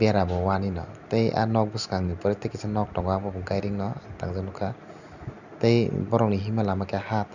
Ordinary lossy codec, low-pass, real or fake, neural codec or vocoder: none; 7.2 kHz; real; none